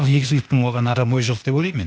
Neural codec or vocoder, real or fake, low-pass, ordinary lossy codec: codec, 16 kHz, 0.8 kbps, ZipCodec; fake; none; none